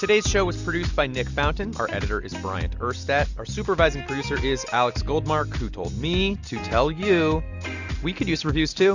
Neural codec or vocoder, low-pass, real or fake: none; 7.2 kHz; real